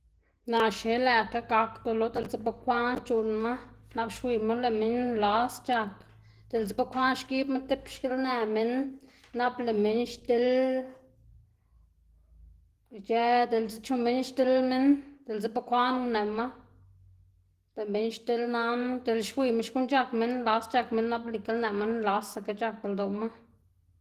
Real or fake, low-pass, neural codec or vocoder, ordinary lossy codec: real; 14.4 kHz; none; Opus, 16 kbps